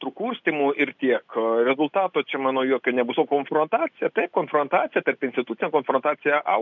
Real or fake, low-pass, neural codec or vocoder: real; 7.2 kHz; none